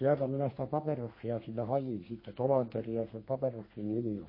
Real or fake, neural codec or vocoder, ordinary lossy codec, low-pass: fake; codec, 32 kHz, 1.9 kbps, SNAC; MP3, 24 kbps; 5.4 kHz